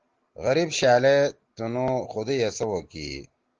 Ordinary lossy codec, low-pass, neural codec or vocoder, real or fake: Opus, 16 kbps; 7.2 kHz; none; real